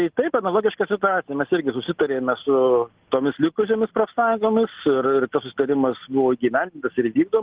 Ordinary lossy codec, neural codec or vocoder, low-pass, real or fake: Opus, 16 kbps; none; 3.6 kHz; real